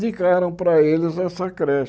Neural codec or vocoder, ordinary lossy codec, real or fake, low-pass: none; none; real; none